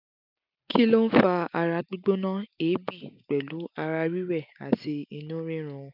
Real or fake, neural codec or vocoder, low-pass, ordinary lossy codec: real; none; 5.4 kHz; none